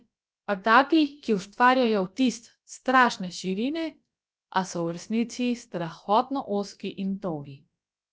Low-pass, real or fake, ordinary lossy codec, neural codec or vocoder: none; fake; none; codec, 16 kHz, about 1 kbps, DyCAST, with the encoder's durations